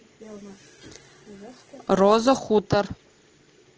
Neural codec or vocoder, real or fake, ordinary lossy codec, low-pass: none; real; Opus, 16 kbps; 7.2 kHz